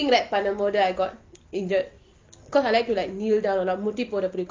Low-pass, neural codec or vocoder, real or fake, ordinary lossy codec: 7.2 kHz; none; real; Opus, 24 kbps